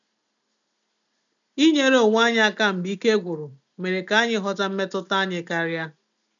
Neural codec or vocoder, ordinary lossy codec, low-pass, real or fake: none; none; 7.2 kHz; real